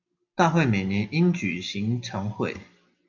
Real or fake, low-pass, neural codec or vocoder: real; 7.2 kHz; none